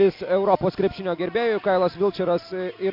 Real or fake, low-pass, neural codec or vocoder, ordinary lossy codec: real; 5.4 kHz; none; MP3, 48 kbps